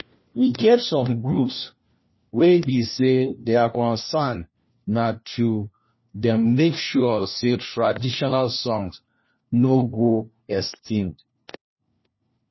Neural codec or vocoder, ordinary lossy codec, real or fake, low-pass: codec, 16 kHz, 1 kbps, FunCodec, trained on LibriTTS, 50 frames a second; MP3, 24 kbps; fake; 7.2 kHz